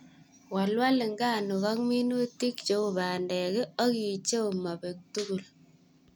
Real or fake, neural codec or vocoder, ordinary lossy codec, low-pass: real; none; none; none